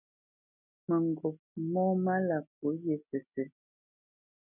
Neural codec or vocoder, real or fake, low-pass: none; real; 3.6 kHz